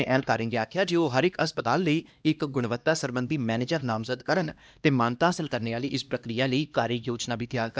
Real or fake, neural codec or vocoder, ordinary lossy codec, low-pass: fake; codec, 16 kHz, 1 kbps, X-Codec, HuBERT features, trained on LibriSpeech; none; none